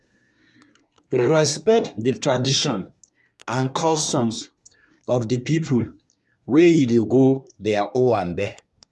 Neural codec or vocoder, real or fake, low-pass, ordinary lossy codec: codec, 24 kHz, 1 kbps, SNAC; fake; none; none